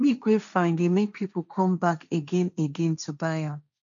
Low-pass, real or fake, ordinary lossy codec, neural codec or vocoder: 7.2 kHz; fake; none; codec, 16 kHz, 1.1 kbps, Voila-Tokenizer